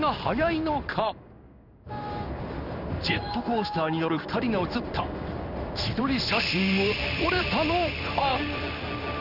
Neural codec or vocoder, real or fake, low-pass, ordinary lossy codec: codec, 16 kHz in and 24 kHz out, 1 kbps, XY-Tokenizer; fake; 5.4 kHz; none